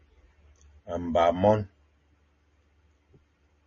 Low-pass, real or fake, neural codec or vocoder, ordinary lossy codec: 7.2 kHz; real; none; MP3, 32 kbps